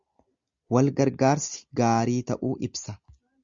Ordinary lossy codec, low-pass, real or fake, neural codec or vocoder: Opus, 64 kbps; 7.2 kHz; real; none